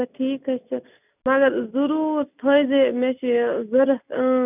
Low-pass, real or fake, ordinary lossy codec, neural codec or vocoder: 3.6 kHz; real; none; none